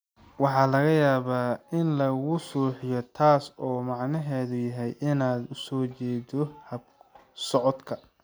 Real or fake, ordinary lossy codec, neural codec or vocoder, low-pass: real; none; none; none